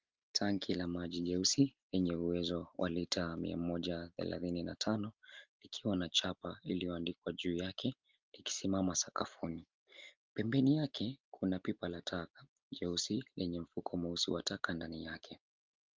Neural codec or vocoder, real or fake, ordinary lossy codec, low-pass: none; real; Opus, 32 kbps; 7.2 kHz